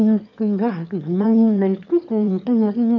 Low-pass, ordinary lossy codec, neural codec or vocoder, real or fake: 7.2 kHz; none; autoencoder, 22.05 kHz, a latent of 192 numbers a frame, VITS, trained on one speaker; fake